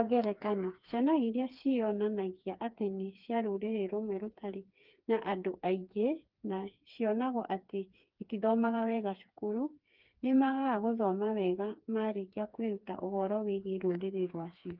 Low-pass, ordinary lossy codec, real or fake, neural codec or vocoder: 5.4 kHz; Opus, 32 kbps; fake; codec, 16 kHz, 4 kbps, FreqCodec, smaller model